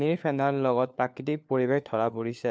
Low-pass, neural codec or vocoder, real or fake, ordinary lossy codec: none; codec, 16 kHz, 4 kbps, FunCodec, trained on LibriTTS, 50 frames a second; fake; none